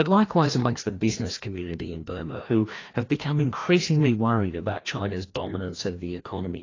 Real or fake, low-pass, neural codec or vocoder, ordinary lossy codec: fake; 7.2 kHz; codec, 16 kHz, 1 kbps, FreqCodec, larger model; AAC, 32 kbps